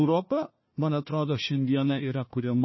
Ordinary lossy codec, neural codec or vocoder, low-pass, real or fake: MP3, 24 kbps; codec, 16 kHz, 1 kbps, FunCodec, trained on Chinese and English, 50 frames a second; 7.2 kHz; fake